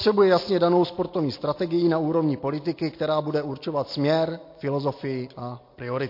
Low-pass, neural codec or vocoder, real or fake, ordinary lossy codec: 5.4 kHz; none; real; MP3, 32 kbps